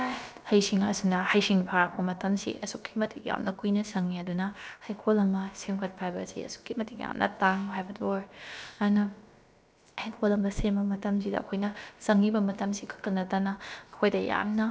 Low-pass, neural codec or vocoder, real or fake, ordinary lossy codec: none; codec, 16 kHz, about 1 kbps, DyCAST, with the encoder's durations; fake; none